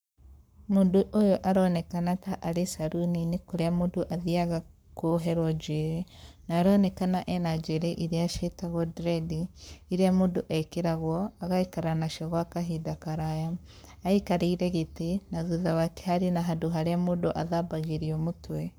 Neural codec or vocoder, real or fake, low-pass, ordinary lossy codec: codec, 44.1 kHz, 7.8 kbps, Pupu-Codec; fake; none; none